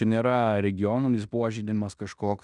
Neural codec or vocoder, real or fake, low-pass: codec, 16 kHz in and 24 kHz out, 0.9 kbps, LongCat-Audio-Codec, fine tuned four codebook decoder; fake; 10.8 kHz